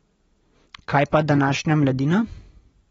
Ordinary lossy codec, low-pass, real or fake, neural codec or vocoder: AAC, 24 kbps; 19.8 kHz; real; none